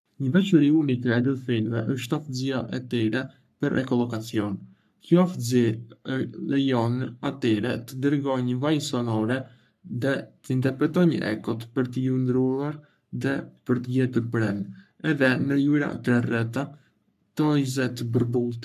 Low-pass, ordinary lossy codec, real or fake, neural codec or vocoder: 14.4 kHz; none; fake; codec, 44.1 kHz, 3.4 kbps, Pupu-Codec